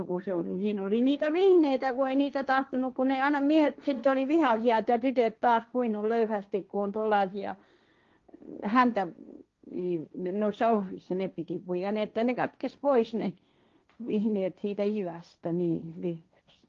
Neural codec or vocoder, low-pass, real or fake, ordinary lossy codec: codec, 16 kHz, 1.1 kbps, Voila-Tokenizer; 7.2 kHz; fake; Opus, 32 kbps